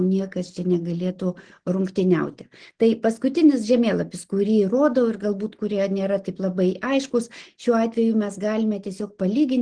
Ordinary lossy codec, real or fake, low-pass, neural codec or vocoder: Opus, 16 kbps; real; 9.9 kHz; none